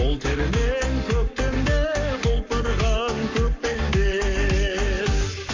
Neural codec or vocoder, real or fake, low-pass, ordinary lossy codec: codec, 44.1 kHz, 7.8 kbps, Pupu-Codec; fake; 7.2 kHz; MP3, 48 kbps